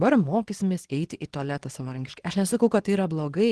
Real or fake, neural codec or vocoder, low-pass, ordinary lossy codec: fake; codec, 24 kHz, 0.9 kbps, WavTokenizer, small release; 10.8 kHz; Opus, 16 kbps